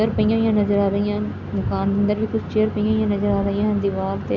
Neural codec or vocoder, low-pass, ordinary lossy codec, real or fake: none; 7.2 kHz; none; real